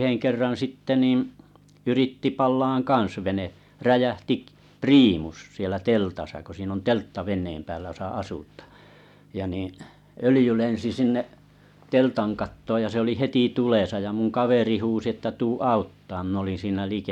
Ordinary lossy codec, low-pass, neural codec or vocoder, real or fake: none; 19.8 kHz; none; real